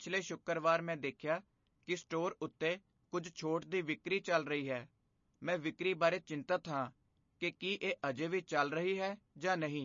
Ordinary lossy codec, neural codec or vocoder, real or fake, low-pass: MP3, 32 kbps; none; real; 7.2 kHz